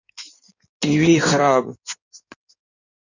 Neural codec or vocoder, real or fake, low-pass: codec, 16 kHz in and 24 kHz out, 1.1 kbps, FireRedTTS-2 codec; fake; 7.2 kHz